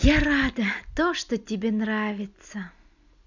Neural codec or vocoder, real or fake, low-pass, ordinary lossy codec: none; real; 7.2 kHz; none